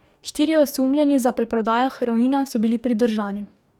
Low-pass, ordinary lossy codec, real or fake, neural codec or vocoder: 19.8 kHz; none; fake; codec, 44.1 kHz, 2.6 kbps, DAC